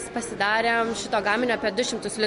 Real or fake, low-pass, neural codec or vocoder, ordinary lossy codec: real; 14.4 kHz; none; MP3, 48 kbps